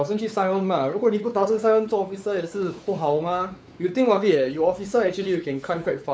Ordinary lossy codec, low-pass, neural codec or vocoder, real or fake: none; none; codec, 16 kHz, 4 kbps, X-Codec, WavLM features, trained on Multilingual LibriSpeech; fake